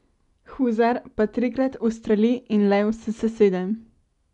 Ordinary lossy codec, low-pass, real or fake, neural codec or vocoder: none; 10.8 kHz; real; none